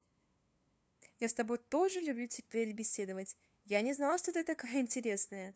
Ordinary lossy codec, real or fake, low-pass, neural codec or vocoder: none; fake; none; codec, 16 kHz, 2 kbps, FunCodec, trained on LibriTTS, 25 frames a second